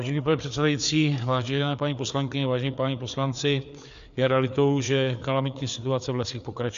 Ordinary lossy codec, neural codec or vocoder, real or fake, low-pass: MP3, 64 kbps; codec, 16 kHz, 4 kbps, FreqCodec, larger model; fake; 7.2 kHz